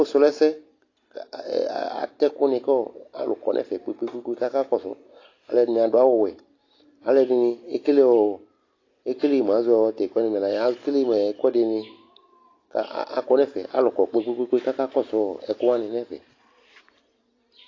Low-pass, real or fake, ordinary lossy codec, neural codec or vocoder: 7.2 kHz; real; AAC, 32 kbps; none